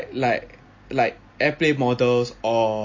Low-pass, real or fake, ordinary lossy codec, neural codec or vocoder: 7.2 kHz; real; MP3, 32 kbps; none